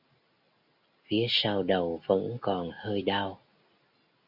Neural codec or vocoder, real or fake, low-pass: none; real; 5.4 kHz